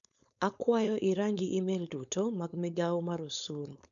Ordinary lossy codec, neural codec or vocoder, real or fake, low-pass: none; codec, 16 kHz, 4.8 kbps, FACodec; fake; 7.2 kHz